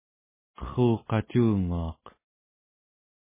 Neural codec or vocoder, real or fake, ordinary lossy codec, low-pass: none; real; MP3, 16 kbps; 3.6 kHz